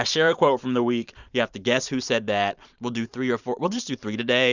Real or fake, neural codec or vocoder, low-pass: fake; vocoder, 44.1 kHz, 128 mel bands every 512 samples, BigVGAN v2; 7.2 kHz